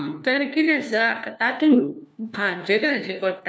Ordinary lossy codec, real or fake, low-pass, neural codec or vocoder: none; fake; none; codec, 16 kHz, 1 kbps, FunCodec, trained on LibriTTS, 50 frames a second